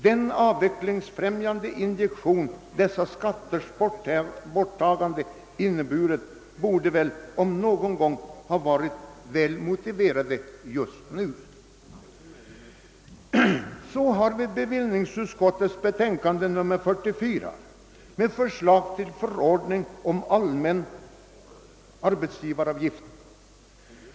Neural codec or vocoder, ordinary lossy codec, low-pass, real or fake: none; none; none; real